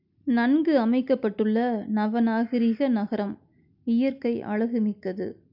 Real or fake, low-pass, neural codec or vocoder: real; 5.4 kHz; none